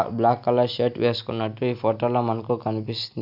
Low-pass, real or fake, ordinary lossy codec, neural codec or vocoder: 5.4 kHz; real; none; none